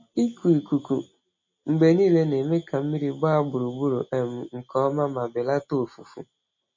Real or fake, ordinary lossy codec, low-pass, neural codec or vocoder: real; MP3, 32 kbps; 7.2 kHz; none